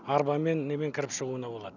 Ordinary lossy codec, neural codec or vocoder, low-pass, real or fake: none; none; 7.2 kHz; real